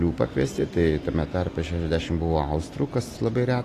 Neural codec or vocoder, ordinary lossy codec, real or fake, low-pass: none; AAC, 48 kbps; real; 14.4 kHz